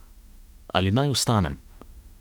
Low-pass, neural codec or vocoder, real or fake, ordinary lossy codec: 19.8 kHz; autoencoder, 48 kHz, 32 numbers a frame, DAC-VAE, trained on Japanese speech; fake; none